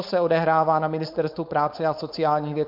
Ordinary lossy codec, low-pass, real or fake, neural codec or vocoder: MP3, 48 kbps; 5.4 kHz; fake; codec, 16 kHz, 4.8 kbps, FACodec